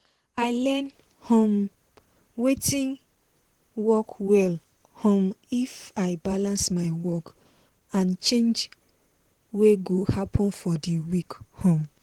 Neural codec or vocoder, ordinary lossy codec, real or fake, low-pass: vocoder, 44.1 kHz, 128 mel bands, Pupu-Vocoder; Opus, 16 kbps; fake; 19.8 kHz